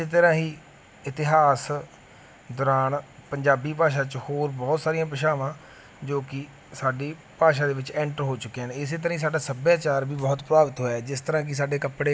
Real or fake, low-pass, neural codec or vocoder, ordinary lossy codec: real; none; none; none